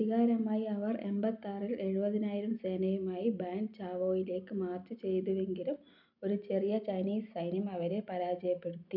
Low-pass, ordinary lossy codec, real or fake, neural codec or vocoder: 5.4 kHz; none; real; none